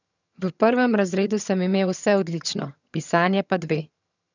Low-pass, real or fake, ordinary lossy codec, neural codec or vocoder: 7.2 kHz; fake; none; vocoder, 22.05 kHz, 80 mel bands, HiFi-GAN